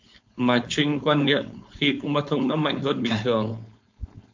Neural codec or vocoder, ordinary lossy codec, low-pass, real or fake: codec, 16 kHz, 4.8 kbps, FACodec; MP3, 64 kbps; 7.2 kHz; fake